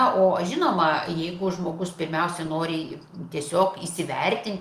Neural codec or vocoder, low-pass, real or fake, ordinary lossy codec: none; 14.4 kHz; real; Opus, 32 kbps